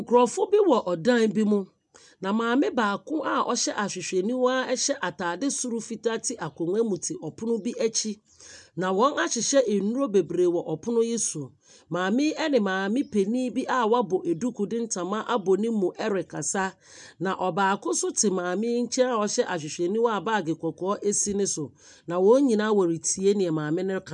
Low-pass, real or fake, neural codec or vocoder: 10.8 kHz; real; none